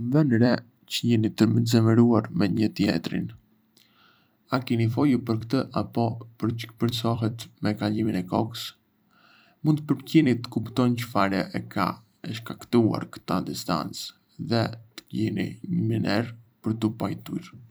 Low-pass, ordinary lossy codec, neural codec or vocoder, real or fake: none; none; vocoder, 44.1 kHz, 128 mel bands every 256 samples, BigVGAN v2; fake